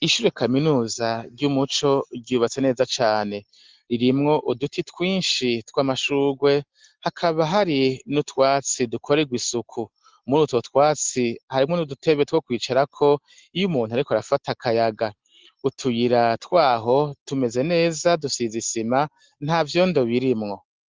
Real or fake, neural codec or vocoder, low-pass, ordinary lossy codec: real; none; 7.2 kHz; Opus, 16 kbps